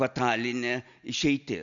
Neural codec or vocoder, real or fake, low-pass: none; real; 7.2 kHz